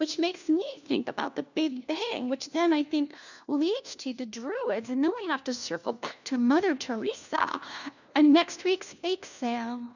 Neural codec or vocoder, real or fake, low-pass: codec, 16 kHz, 1 kbps, FunCodec, trained on LibriTTS, 50 frames a second; fake; 7.2 kHz